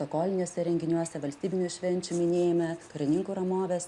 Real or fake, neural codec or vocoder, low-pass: real; none; 10.8 kHz